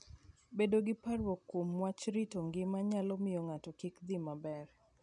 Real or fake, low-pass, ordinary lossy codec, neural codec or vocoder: real; 10.8 kHz; none; none